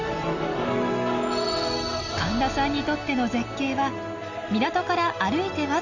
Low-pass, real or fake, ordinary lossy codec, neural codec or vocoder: 7.2 kHz; real; none; none